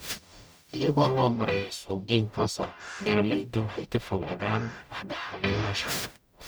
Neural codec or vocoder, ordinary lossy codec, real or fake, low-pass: codec, 44.1 kHz, 0.9 kbps, DAC; none; fake; none